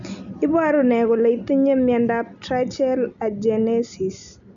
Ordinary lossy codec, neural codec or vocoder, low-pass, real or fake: none; none; 7.2 kHz; real